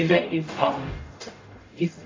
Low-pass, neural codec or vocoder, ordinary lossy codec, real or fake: 7.2 kHz; codec, 44.1 kHz, 0.9 kbps, DAC; AAC, 32 kbps; fake